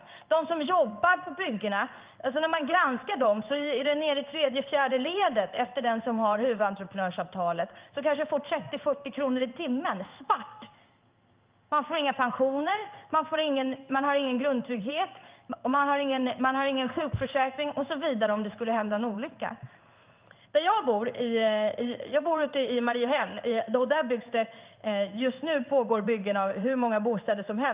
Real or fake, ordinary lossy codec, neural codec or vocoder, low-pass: fake; Opus, 24 kbps; codec, 16 kHz in and 24 kHz out, 1 kbps, XY-Tokenizer; 3.6 kHz